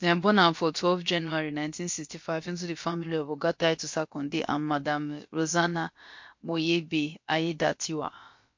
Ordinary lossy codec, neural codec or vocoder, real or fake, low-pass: MP3, 48 kbps; codec, 16 kHz, about 1 kbps, DyCAST, with the encoder's durations; fake; 7.2 kHz